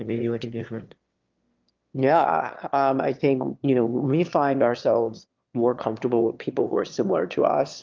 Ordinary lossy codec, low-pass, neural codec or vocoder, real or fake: Opus, 32 kbps; 7.2 kHz; autoencoder, 22.05 kHz, a latent of 192 numbers a frame, VITS, trained on one speaker; fake